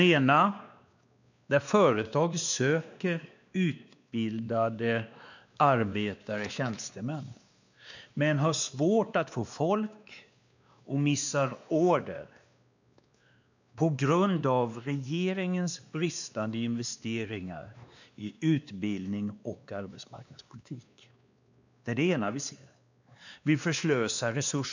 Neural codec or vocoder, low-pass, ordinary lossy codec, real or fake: codec, 16 kHz, 2 kbps, X-Codec, WavLM features, trained on Multilingual LibriSpeech; 7.2 kHz; none; fake